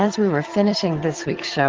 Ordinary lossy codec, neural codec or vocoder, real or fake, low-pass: Opus, 16 kbps; vocoder, 22.05 kHz, 80 mel bands, HiFi-GAN; fake; 7.2 kHz